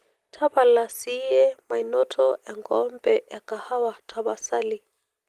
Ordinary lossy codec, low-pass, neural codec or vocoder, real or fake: Opus, 24 kbps; 14.4 kHz; none; real